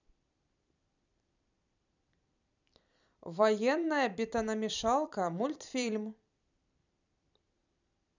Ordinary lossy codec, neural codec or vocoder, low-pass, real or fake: none; vocoder, 44.1 kHz, 128 mel bands every 256 samples, BigVGAN v2; 7.2 kHz; fake